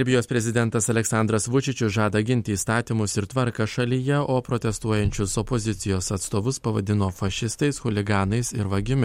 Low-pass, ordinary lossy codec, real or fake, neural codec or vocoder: 19.8 kHz; MP3, 64 kbps; real; none